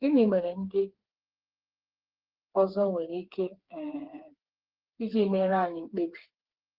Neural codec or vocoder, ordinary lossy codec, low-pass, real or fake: codec, 16 kHz, 4 kbps, FreqCodec, smaller model; Opus, 16 kbps; 5.4 kHz; fake